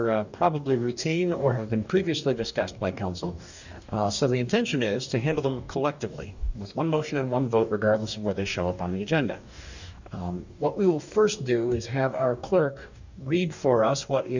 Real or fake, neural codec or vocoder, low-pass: fake; codec, 44.1 kHz, 2.6 kbps, DAC; 7.2 kHz